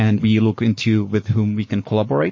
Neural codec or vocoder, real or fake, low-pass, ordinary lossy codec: codec, 44.1 kHz, 7.8 kbps, Pupu-Codec; fake; 7.2 kHz; MP3, 32 kbps